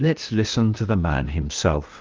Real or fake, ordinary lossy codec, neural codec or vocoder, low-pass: fake; Opus, 32 kbps; codec, 16 kHz, 0.8 kbps, ZipCodec; 7.2 kHz